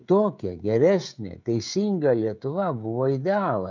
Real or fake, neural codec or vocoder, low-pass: fake; codec, 16 kHz, 16 kbps, FreqCodec, smaller model; 7.2 kHz